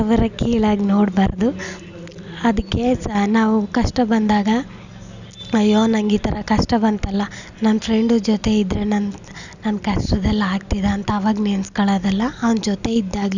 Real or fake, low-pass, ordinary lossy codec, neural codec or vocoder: real; 7.2 kHz; none; none